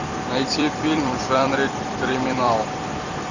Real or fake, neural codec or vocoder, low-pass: fake; vocoder, 44.1 kHz, 128 mel bands every 256 samples, BigVGAN v2; 7.2 kHz